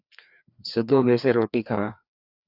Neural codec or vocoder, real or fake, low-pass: codec, 16 kHz, 2 kbps, FreqCodec, larger model; fake; 5.4 kHz